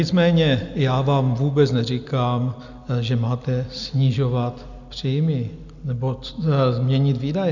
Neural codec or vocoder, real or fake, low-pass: none; real; 7.2 kHz